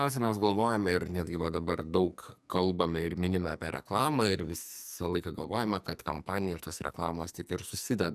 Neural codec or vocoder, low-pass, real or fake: codec, 44.1 kHz, 2.6 kbps, SNAC; 14.4 kHz; fake